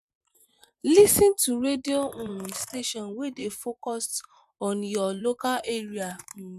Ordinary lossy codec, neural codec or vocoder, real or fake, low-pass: none; vocoder, 44.1 kHz, 128 mel bands, Pupu-Vocoder; fake; 14.4 kHz